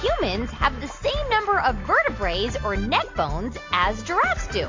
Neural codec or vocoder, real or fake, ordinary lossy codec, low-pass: none; real; MP3, 48 kbps; 7.2 kHz